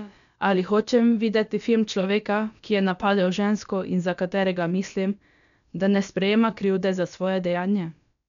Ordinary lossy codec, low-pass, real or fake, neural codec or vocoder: none; 7.2 kHz; fake; codec, 16 kHz, about 1 kbps, DyCAST, with the encoder's durations